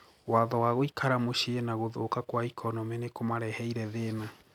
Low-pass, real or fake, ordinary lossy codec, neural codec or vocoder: 19.8 kHz; real; none; none